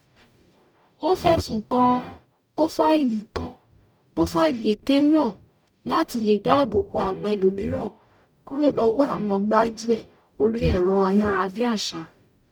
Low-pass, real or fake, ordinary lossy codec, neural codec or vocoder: 19.8 kHz; fake; none; codec, 44.1 kHz, 0.9 kbps, DAC